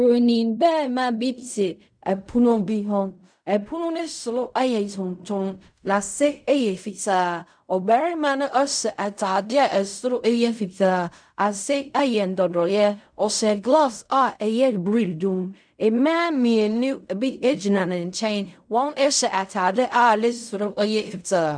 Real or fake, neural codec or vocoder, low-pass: fake; codec, 16 kHz in and 24 kHz out, 0.4 kbps, LongCat-Audio-Codec, fine tuned four codebook decoder; 9.9 kHz